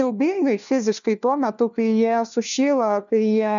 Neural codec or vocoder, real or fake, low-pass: codec, 16 kHz, 1 kbps, FunCodec, trained on LibriTTS, 50 frames a second; fake; 7.2 kHz